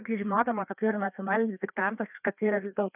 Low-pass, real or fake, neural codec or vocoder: 3.6 kHz; fake; codec, 16 kHz, 2 kbps, FreqCodec, larger model